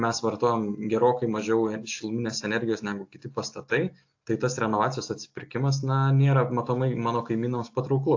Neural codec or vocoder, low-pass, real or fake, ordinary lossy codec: none; 7.2 kHz; real; AAC, 48 kbps